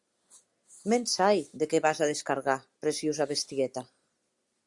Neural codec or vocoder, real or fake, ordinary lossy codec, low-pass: none; real; Opus, 64 kbps; 10.8 kHz